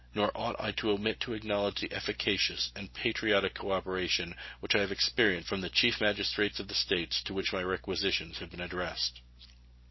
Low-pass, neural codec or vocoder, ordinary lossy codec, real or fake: 7.2 kHz; none; MP3, 24 kbps; real